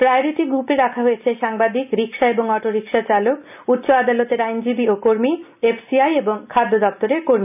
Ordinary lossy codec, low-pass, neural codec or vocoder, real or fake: none; 3.6 kHz; none; real